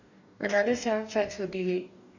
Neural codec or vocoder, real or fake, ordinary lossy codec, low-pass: codec, 44.1 kHz, 2.6 kbps, DAC; fake; none; 7.2 kHz